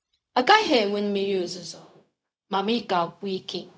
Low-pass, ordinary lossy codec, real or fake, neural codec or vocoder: none; none; fake; codec, 16 kHz, 0.4 kbps, LongCat-Audio-Codec